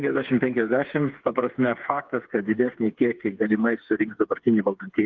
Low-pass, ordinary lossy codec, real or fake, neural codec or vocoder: 7.2 kHz; Opus, 32 kbps; fake; codec, 16 kHz, 4 kbps, FreqCodec, smaller model